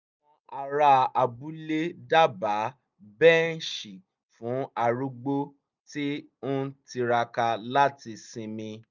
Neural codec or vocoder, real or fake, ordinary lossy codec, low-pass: none; real; none; 7.2 kHz